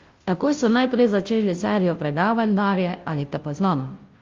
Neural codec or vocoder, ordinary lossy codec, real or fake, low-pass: codec, 16 kHz, 0.5 kbps, FunCodec, trained on Chinese and English, 25 frames a second; Opus, 32 kbps; fake; 7.2 kHz